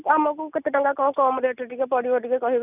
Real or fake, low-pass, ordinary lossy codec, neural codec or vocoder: real; 3.6 kHz; none; none